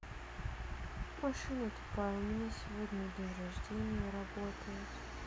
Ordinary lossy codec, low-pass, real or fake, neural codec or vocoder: none; none; real; none